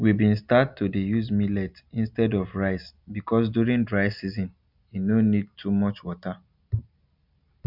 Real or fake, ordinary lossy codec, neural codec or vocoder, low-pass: real; none; none; 5.4 kHz